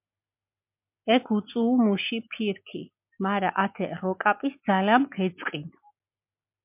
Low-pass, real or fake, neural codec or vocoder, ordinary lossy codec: 3.6 kHz; real; none; MP3, 32 kbps